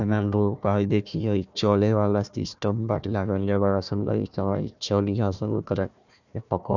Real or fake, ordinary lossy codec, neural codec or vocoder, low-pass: fake; none; codec, 16 kHz, 1 kbps, FunCodec, trained on Chinese and English, 50 frames a second; 7.2 kHz